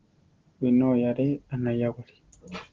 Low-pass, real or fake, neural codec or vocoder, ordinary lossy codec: 7.2 kHz; real; none; Opus, 16 kbps